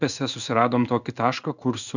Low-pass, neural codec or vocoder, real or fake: 7.2 kHz; codec, 16 kHz in and 24 kHz out, 1 kbps, XY-Tokenizer; fake